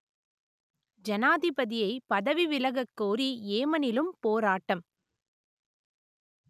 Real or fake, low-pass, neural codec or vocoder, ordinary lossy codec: real; 14.4 kHz; none; none